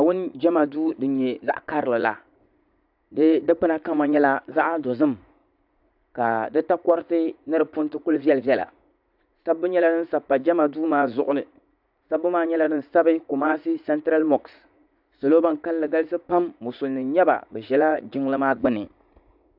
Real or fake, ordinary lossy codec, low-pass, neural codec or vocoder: fake; AAC, 48 kbps; 5.4 kHz; vocoder, 44.1 kHz, 80 mel bands, Vocos